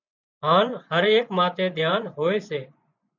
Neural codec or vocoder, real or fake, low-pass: vocoder, 24 kHz, 100 mel bands, Vocos; fake; 7.2 kHz